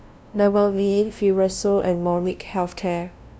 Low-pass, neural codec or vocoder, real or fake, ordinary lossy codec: none; codec, 16 kHz, 0.5 kbps, FunCodec, trained on LibriTTS, 25 frames a second; fake; none